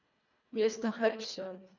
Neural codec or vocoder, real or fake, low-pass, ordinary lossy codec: codec, 24 kHz, 1.5 kbps, HILCodec; fake; 7.2 kHz; none